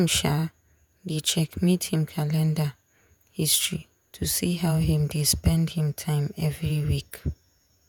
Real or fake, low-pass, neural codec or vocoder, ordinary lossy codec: fake; none; vocoder, 48 kHz, 128 mel bands, Vocos; none